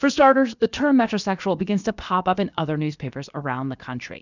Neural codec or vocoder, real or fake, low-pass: codec, 16 kHz, about 1 kbps, DyCAST, with the encoder's durations; fake; 7.2 kHz